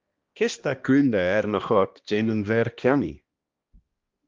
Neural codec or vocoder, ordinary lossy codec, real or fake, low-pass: codec, 16 kHz, 1 kbps, X-Codec, HuBERT features, trained on balanced general audio; Opus, 24 kbps; fake; 7.2 kHz